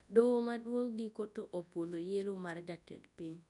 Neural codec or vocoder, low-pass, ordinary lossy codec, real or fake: codec, 24 kHz, 0.9 kbps, WavTokenizer, large speech release; 10.8 kHz; none; fake